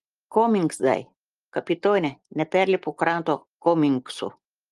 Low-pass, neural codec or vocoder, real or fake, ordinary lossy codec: 10.8 kHz; none; real; Opus, 24 kbps